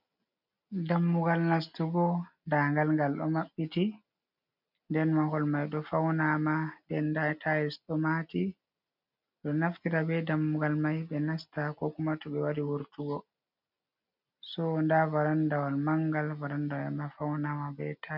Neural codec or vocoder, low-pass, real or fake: none; 5.4 kHz; real